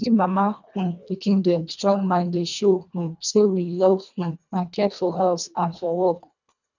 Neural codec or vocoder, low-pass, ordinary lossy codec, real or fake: codec, 24 kHz, 1.5 kbps, HILCodec; 7.2 kHz; none; fake